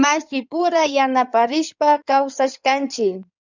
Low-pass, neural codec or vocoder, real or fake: 7.2 kHz; codec, 16 kHz in and 24 kHz out, 2.2 kbps, FireRedTTS-2 codec; fake